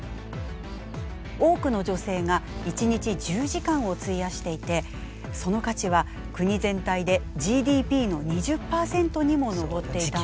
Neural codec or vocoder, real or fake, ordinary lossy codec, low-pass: none; real; none; none